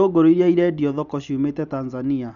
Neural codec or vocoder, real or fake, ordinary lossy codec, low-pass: none; real; none; 7.2 kHz